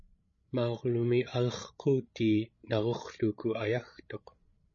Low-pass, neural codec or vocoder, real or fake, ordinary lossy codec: 7.2 kHz; codec, 16 kHz, 16 kbps, FreqCodec, larger model; fake; MP3, 32 kbps